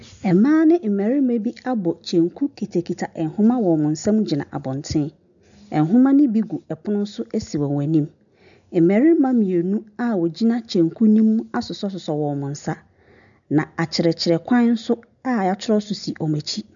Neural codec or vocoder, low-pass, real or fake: none; 7.2 kHz; real